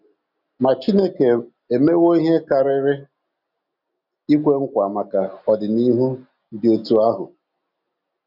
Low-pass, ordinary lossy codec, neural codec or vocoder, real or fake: 5.4 kHz; none; none; real